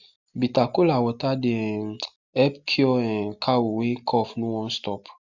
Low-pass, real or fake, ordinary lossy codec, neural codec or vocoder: 7.2 kHz; real; Opus, 64 kbps; none